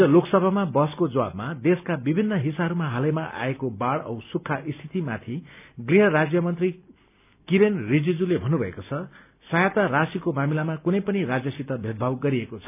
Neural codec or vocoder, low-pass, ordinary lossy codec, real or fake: none; 3.6 kHz; none; real